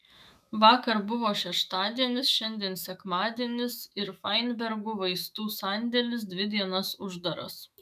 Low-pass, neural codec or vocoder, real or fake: 14.4 kHz; autoencoder, 48 kHz, 128 numbers a frame, DAC-VAE, trained on Japanese speech; fake